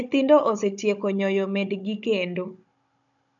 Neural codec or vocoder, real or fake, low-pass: codec, 16 kHz, 16 kbps, FunCodec, trained on Chinese and English, 50 frames a second; fake; 7.2 kHz